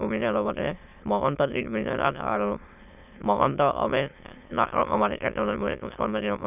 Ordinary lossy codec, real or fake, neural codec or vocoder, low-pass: none; fake; autoencoder, 22.05 kHz, a latent of 192 numbers a frame, VITS, trained on many speakers; 3.6 kHz